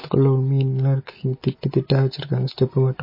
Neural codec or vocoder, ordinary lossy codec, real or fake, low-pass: none; MP3, 24 kbps; real; 5.4 kHz